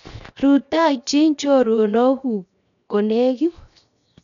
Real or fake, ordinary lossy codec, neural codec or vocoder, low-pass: fake; none; codec, 16 kHz, 0.7 kbps, FocalCodec; 7.2 kHz